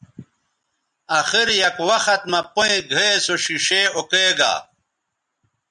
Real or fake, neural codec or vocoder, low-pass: real; none; 10.8 kHz